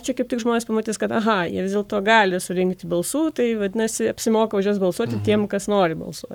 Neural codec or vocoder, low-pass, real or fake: codec, 44.1 kHz, 7.8 kbps, Pupu-Codec; 19.8 kHz; fake